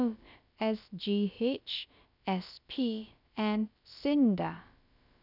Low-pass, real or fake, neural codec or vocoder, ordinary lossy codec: 5.4 kHz; fake; codec, 16 kHz, about 1 kbps, DyCAST, with the encoder's durations; none